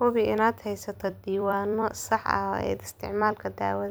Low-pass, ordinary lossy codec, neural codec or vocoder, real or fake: none; none; vocoder, 44.1 kHz, 128 mel bands every 256 samples, BigVGAN v2; fake